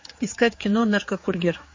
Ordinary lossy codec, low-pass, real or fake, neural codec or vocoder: MP3, 32 kbps; 7.2 kHz; fake; codec, 16 kHz, 4 kbps, X-Codec, HuBERT features, trained on general audio